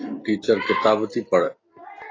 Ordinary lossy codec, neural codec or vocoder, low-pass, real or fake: AAC, 32 kbps; none; 7.2 kHz; real